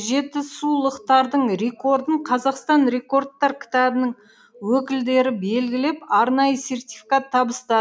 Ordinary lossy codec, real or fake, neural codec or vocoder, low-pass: none; real; none; none